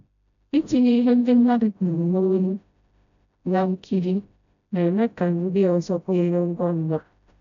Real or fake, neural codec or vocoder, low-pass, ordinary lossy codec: fake; codec, 16 kHz, 0.5 kbps, FreqCodec, smaller model; 7.2 kHz; none